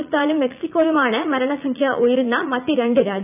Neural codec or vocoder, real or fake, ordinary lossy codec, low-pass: vocoder, 44.1 kHz, 80 mel bands, Vocos; fake; none; 3.6 kHz